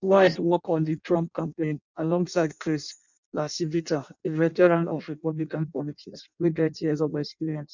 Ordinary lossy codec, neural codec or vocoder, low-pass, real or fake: none; codec, 16 kHz in and 24 kHz out, 0.6 kbps, FireRedTTS-2 codec; 7.2 kHz; fake